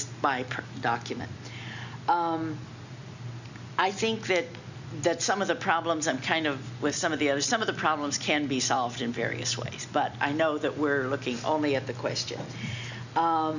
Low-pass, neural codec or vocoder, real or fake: 7.2 kHz; none; real